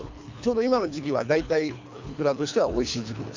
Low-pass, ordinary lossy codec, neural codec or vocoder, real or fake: 7.2 kHz; MP3, 64 kbps; codec, 24 kHz, 3 kbps, HILCodec; fake